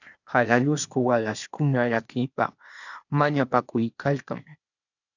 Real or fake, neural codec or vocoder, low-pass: fake; codec, 16 kHz, 0.8 kbps, ZipCodec; 7.2 kHz